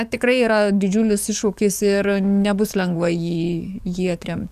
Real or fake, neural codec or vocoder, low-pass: fake; codec, 44.1 kHz, 7.8 kbps, DAC; 14.4 kHz